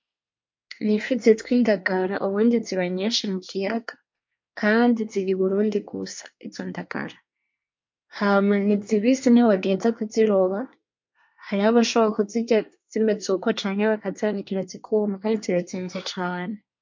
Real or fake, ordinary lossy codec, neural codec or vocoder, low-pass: fake; MP3, 48 kbps; codec, 24 kHz, 1 kbps, SNAC; 7.2 kHz